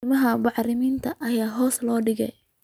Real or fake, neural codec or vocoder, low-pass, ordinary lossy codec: real; none; 19.8 kHz; none